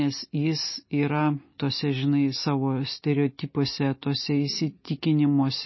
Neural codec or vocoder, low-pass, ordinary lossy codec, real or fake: none; 7.2 kHz; MP3, 24 kbps; real